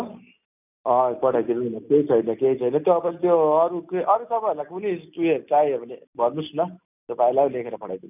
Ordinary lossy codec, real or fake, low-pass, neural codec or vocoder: none; real; 3.6 kHz; none